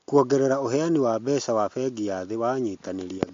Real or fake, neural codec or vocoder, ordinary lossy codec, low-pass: real; none; MP3, 48 kbps; 7.2 kHz